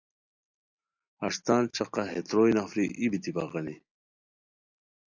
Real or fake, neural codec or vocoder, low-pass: real; none; 7.2 kHz